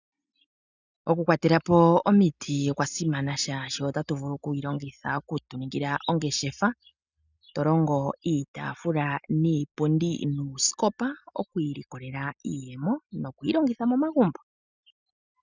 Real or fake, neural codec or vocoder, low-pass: real; none; 7.2 kHz